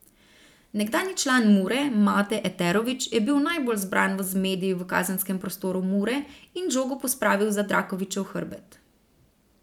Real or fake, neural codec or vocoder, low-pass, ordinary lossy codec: fake; vocoder, 44.1 kHz, 128 mel bands every 512 samples, BigVGAN v2; 19.8 kHz; none